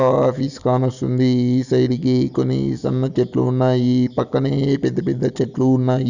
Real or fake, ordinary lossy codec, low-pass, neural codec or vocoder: real; none; 7.2 kHz; none